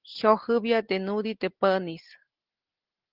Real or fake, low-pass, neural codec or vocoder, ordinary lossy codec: real; 5.4 kHz; none; Opus, 16 kbps